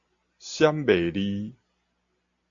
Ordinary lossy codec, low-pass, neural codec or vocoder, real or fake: AAC, 64 kbps; 7.2 kHz; none; real